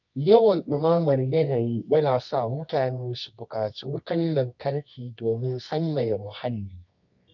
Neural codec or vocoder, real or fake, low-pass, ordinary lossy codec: codec, 24 kHz, 0.9 kbps, WavTokenizer, medium music audio release; fake; 7.2 kHz; none